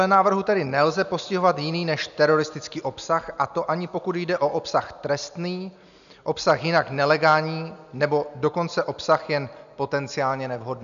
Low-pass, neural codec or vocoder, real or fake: 7.2 kHz; none; real